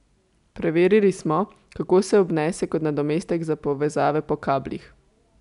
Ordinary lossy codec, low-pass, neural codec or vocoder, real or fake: none; 10.8 kHz; none; real